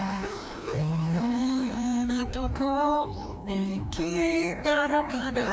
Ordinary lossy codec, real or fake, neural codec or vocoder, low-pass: none; fake; codec, 16 kHz, 1 kbps, FreqCodec, larger model; none